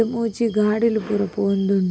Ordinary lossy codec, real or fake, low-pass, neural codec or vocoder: none; real; none; none